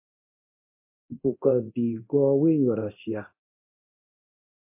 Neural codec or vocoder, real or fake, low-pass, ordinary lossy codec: codec, 24 kHz, 0.9 kbps, DualCodec; fake; 3.6 kHz; MP3, 24 kbps